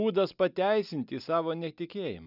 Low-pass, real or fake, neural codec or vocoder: 5.4 kHz; real; none